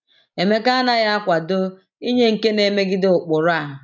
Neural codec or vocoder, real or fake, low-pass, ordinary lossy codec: none; real; 7.2 kHz; none